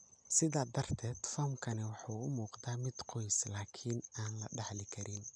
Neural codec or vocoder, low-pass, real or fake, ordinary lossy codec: none; 9.9 kHz; real; none